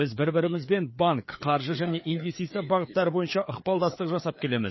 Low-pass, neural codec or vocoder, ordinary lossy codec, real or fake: 7.2 kHz; codec, 16 kHz, 4 kbps, FreqCodec, larger model; MP3, 24 kbps; fake